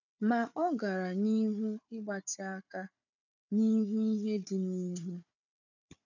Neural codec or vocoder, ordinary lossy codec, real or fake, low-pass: codec, 16 kHz, 4 kbps, FunCodec, trained on Chinese and English, 50 frames a second; none; fake; 7.2 kHz